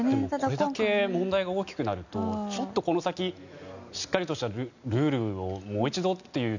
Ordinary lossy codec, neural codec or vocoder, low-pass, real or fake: none; none; 7.2 kHz; real